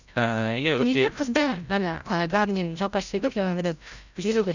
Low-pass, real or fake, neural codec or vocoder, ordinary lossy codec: 7.2 kHz; fake; codec, 16 kHz, 0.5 kbps, FreqCodec, larger model; none